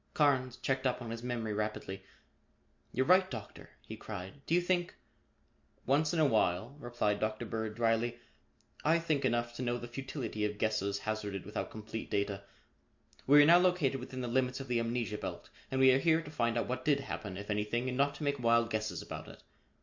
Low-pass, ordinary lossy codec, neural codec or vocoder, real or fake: 7.2 kHz; MP3, 48 kbps; none; real